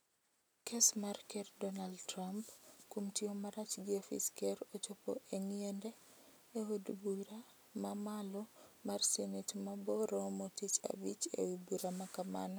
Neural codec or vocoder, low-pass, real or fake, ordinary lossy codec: none; none; real; none